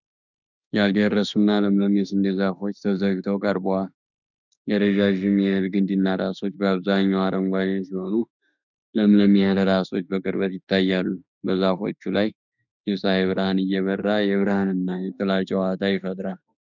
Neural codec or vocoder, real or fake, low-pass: autoencoder, 48 kHz, 32 numbers a frame, DAC-VAE, trained on Japanese speech; fake; 7.2 kHz